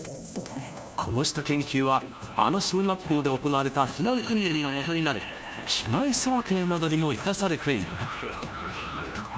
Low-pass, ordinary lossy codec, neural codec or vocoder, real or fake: none; none; codec, 16 kHz, 1 kbps, FunCodec, trained on LibriTTS, 50 frames a second; fake